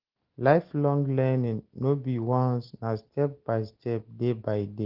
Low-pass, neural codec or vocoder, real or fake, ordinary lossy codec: 5.4 kHz; none; real; Opus, 16 kbps